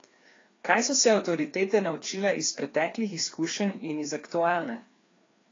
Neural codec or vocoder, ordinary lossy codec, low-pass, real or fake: codec, 16 kHz, 2 kbps, FreqCodec, larger model; AAC, 32 kbps; 7.2 kHz; fake